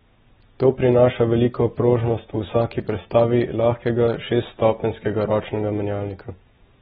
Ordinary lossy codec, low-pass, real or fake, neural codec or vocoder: AAC, 16 kbps; 19.8 kHz; real; none